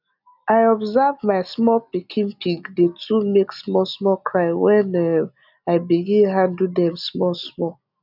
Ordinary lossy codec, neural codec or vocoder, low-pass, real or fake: none; none; 5.4 kHz; real